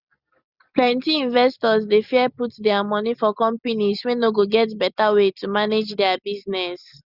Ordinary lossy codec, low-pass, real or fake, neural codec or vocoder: Opus, 64 kbps; 5.4 kHz; real; none